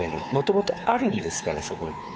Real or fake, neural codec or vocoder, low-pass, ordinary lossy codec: fake; codec, 16 kHz, 4 kbps, X-Codec, WavLM features, trained on Multilingual LibriSpeech; none; none